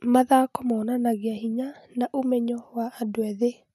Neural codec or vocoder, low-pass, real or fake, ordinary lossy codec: none; 14.4 kHz; real; none